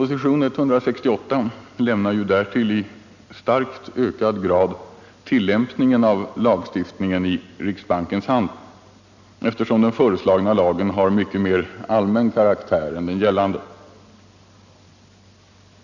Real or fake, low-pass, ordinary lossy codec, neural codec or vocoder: real; 7.2 kHz; none; none